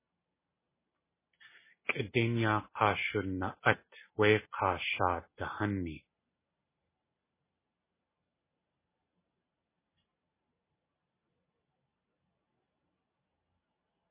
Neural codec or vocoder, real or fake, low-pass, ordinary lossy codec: none; real; 3.6 kHz; MP3, 16 kbps